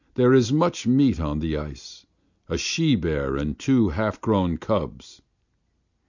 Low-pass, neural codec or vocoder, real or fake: 7.2 kHz; none; real